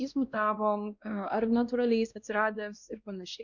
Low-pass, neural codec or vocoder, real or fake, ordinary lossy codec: 7.2 kHz; codec, 16 kHz, 1 kbps, X-Codec, WavLM features, trained on Multilingual LibriSpeech; fake; Opus, 64 kbps